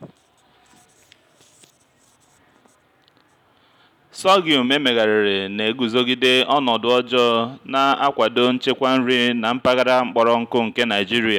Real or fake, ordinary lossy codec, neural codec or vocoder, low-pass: real; none; none; 19.8 kHz